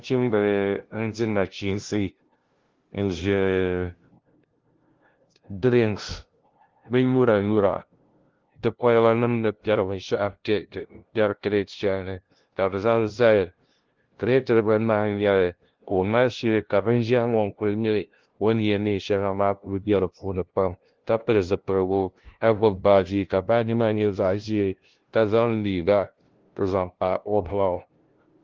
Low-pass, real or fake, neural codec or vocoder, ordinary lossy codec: 7.2 kHz; fake; codec, 16 kHz, 0.5 kbps, FunCodec, trained on LibriTTS, 25 frames a second; Opus, 16 kbps